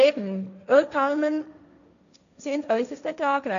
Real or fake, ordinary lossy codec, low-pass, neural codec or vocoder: fake; none; 7.2 kHz; codec, 16 kHz, 1.1 kbps, Voila-Tokenizer